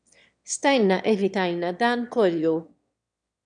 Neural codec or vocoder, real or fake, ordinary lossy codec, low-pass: autoencoder, 22.05 kHz, a latent of 192 numbers a frame, VITS, trained on one speaker; fake; MP3, 96 kbps; 9.9 kHz